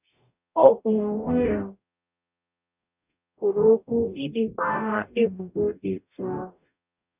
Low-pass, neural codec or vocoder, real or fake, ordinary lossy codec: 3.6 kHz; codec, 44.1 kHz, 0.9 kbps, DAC; fake; none